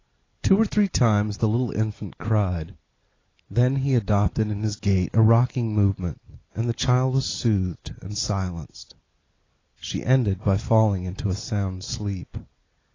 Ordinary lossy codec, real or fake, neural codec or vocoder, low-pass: AAC, 32 kbps; real; none; 7.2 kHz